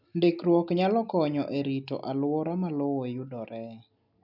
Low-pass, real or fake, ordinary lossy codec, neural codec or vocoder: 5.4 kHz; real; none; none